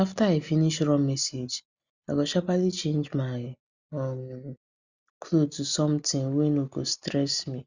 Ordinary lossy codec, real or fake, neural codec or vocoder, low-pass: Opus, 64 kbps; real; none; 7.2 kHz